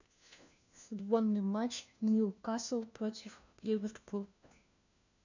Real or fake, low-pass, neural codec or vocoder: fake; 7.2 kHz; codec, 16 kHz, 1 kbps, FunCodec, trained on LibriTTS, 50 frames a second